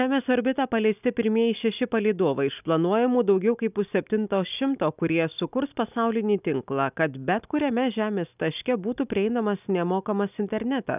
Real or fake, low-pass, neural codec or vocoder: real; 3.6 kHz; none